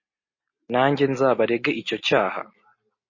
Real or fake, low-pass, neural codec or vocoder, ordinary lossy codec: real; 7.2 kHz; none; MP3, 32 kbps